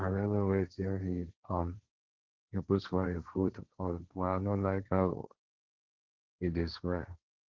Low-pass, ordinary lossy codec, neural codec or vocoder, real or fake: 7.2 kHz; Opus, 16 kbps; codec, 16 kHz, 1.1 kbps, Voila-Tokenizer; fake